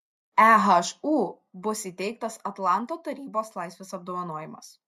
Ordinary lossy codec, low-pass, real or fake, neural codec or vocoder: MP3, 64 kbps; 14.4 kHz; real; none